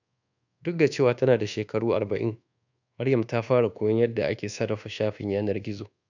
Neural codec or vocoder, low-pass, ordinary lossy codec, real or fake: codec, 24 kHz, 1.2 kbps, DualCodec; 7.2 kHz; none; fake